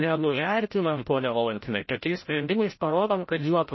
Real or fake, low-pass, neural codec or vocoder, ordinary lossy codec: fake; 7.2 kHz; codec, 16 kHz, 0.5 kbps, FreqCodec, larger model; MP3, 24 kbps